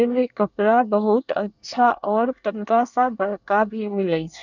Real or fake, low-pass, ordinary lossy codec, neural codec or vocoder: fake; 7.2 kHz; none; codec, 24 kHz, 1 kbps, SNAC